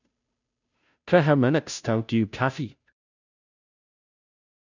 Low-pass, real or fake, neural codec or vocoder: 7.2 kHz; fake; codec, 16 kHz, 0.5 kbps, FunCodec, trained on Chinese and English, 25 frames a second